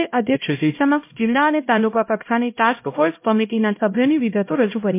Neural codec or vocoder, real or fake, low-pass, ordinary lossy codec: codec, 16 kHz, 0.5 kbps, X-Codec, HuBERT features, trained on LibriSpeech; fake; 3.6 kHz; MP3, 24 kbps